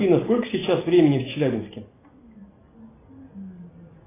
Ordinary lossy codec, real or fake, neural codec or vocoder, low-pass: AAC, 16 kbps; real; none; 3.6 kHz